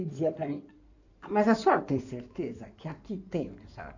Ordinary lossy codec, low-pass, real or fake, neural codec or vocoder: none; 7.2 kHz; fake; codec, 16 kHz in and 24 kHz out, 2.2 kbps, FireRedTTS-2 codec